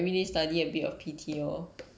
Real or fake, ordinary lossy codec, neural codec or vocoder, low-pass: real; none; none; none